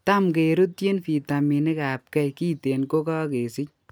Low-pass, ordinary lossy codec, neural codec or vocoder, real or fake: none; none; none; real